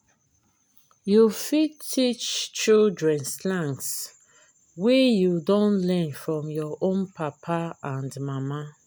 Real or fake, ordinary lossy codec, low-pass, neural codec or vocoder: real; none; none; none